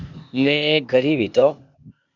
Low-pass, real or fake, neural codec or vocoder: 7.2 kHz; fake; codec, 16 kHz, 0.8 kbps, ZipCodec